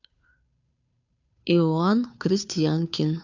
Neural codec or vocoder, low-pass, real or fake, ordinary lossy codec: codec, 16 kHz, 2 kbps, FunCodec, trained on Chinese and English, 25 frames a second; 7.2 kHz; fake; none